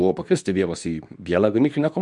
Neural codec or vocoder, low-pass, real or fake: codec, 24 kHz, 0.9 kbps, WavTokenizer, medium speech release version 2; 10.8 kHz; fake